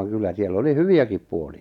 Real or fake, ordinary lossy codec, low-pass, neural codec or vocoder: real; none; 19.8 kHz; none